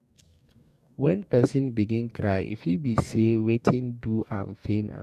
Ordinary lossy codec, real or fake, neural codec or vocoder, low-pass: none; fake; codec, 44.1 kHz, 2.6 kbps, DAC; 14.4 kHz